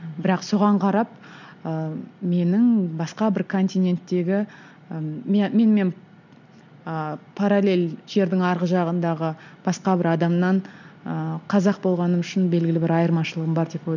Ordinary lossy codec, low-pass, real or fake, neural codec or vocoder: none; 7.2 kHz; real; none